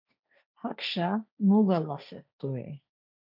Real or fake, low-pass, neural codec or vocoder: fake; 5.4 kHz; codec, 16 kHz, 1.1 kbps, Voila-Tokenizer